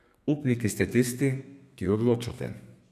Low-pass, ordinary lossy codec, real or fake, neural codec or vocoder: 14.4 kHz; none; fake; codec, 32 kHz, 1.9 kbps, SNAC